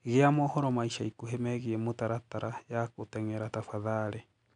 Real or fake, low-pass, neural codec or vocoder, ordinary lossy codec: real; 9.9 kHz; none; none